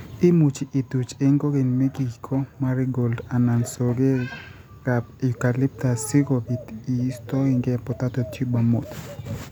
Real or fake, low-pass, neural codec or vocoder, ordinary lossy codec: real; none; none; none